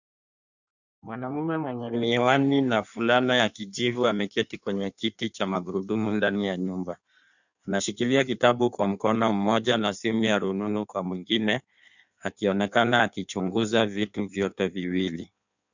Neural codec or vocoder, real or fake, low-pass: codec, 16 kHz in and 24 kHz out, 1.1 kbps, FireRedTTS-2 codec; fake; 7.2 kHz